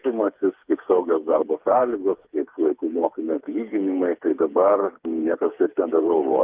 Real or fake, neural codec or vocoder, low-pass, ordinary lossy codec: fake; codec, 16 kHz, 4 kbps, FreqCodec, smaller model; 3.6 kHz; Opus, 32 kbps